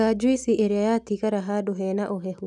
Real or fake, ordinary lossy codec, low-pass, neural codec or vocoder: real; none; none; none